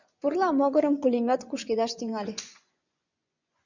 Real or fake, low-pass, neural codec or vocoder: fake; 7.2 kHz; vocoder, 24 kHz, 100 mel bands, Vocos